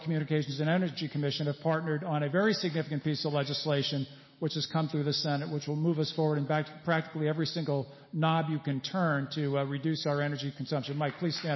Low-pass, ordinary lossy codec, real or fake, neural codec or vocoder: 7.2 kHz; MP3, 24 kbps; real; none